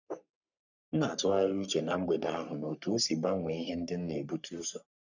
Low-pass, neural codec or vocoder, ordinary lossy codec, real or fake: 7.2 kHz; codec, 44.1 kHz, 3.4 kbps, Pupu-Codec; none; fake